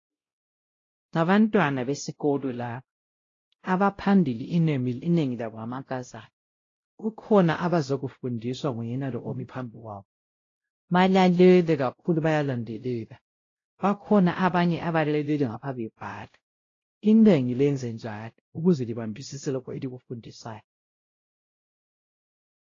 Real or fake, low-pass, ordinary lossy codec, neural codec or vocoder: fake; 7.2 kHz; AAC, 32 kbps; codec, 16 kHz, 0.5 kbps, X-Codec, WavLM features, trained on Multilingual LibriSpeech